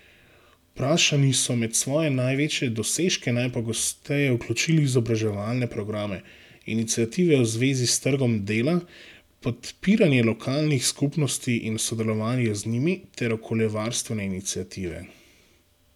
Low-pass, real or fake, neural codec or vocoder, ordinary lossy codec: 19.8 kHz; real; none; none